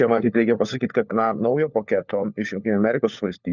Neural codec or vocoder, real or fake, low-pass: codec, 16 kHz, 4 kbps, FunCodec, trained on LibriTTS, 50 frames a second; fake; 7.2 kHz